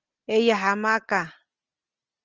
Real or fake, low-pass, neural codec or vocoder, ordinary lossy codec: real; 7.2 kHz; none; Opus, 24 kbps